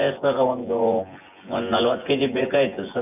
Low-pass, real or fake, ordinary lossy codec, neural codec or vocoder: 3.6 kHz; fake; none; vocoder, 24 kHz, 100 mel bands, Vocos